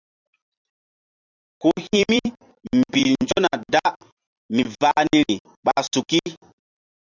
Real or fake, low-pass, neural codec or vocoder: fake; 7.2 kHz; vocoder, 44.1 kHz, 128 mel bands every 256 samples, BigVGAN v2